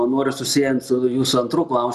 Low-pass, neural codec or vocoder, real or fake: 14.4 kHz; none; real